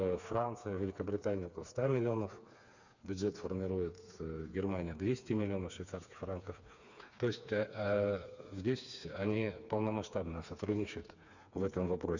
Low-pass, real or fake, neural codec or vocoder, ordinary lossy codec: 7.2 kHz; fake; codec, 16 kHz, 4 kbps, FreqCodec, smaller model; none